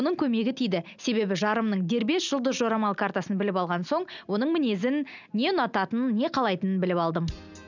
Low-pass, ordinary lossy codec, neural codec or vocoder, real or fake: 7.2 kHz; none; none; real